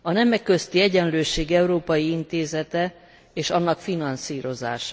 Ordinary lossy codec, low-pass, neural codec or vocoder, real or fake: none; none; none; real